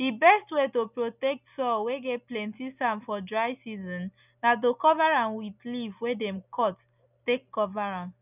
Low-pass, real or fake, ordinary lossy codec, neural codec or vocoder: 3.6 kHz; real; none; none